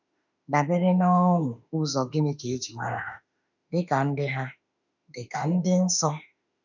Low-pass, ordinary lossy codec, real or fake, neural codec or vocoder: 7.2 kHz; none; fake; autoencoder, 48 kHz, 32 numbers a frame, DAC-VAE, trained on Japanese speech